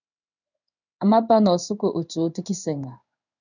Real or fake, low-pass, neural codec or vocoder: fake; 7.2 kHz; codec, 16 kHz in and 24 kHz out, 1 kbps, XY-Tokenizer